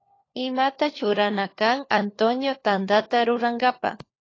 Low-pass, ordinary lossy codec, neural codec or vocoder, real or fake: 7.2 kHz; AAC, 32 kbps; codec, 16 kHz, 4 kbps, FunCodec, trained on LibriTTS, 50 frames a second; fake